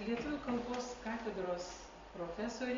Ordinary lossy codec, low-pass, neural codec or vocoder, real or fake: AAC, 32 kbps; 7.2 kHz; none; real